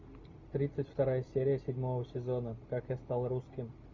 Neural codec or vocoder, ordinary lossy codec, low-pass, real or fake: none; Opus, 64 kbps; 7.2 kHz; real